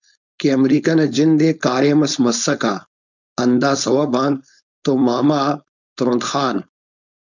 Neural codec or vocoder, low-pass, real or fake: codec, 16 kHz, 4.8 kbps, FACodec; 7.2 kHz; fake